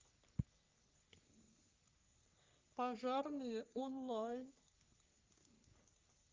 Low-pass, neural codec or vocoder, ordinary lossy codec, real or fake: 7.2 kHz; codec, 44.1 kHz, 3.4 kbps, Pupu-Codec; Opus, 24 kbps; fake